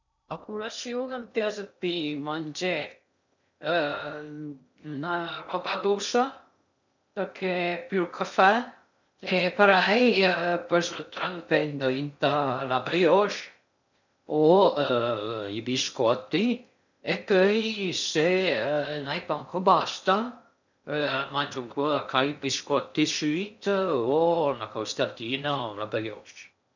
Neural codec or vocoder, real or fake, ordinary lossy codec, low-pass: codec, 16 kHz in and 24 kHz out, 0.6 kbps, FocalCodec, streaming, 2048 codes; fake; none; 7.2 kHz